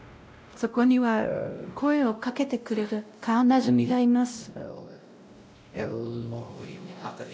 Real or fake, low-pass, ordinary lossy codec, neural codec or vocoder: fake; none; none; codec, 16 kHz, 0.5 kbps, X-Codec, WavLM features, trained on Multilingual LibriSpeech